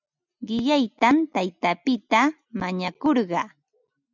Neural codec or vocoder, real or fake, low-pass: none; real; 7.2 kHz